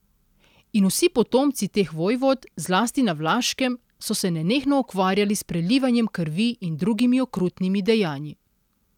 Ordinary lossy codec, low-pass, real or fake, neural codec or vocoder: none; 19.8 kHz; real; none